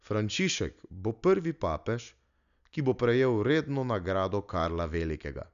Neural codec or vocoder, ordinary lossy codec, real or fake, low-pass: none; none; real; 7.2 kHz